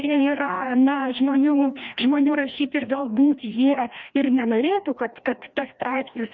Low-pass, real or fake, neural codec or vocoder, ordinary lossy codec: 7.2 kHz; fake; codec, 16 kHz, 1 kbps, FreqCodec, larger model; MP3, 64 kbps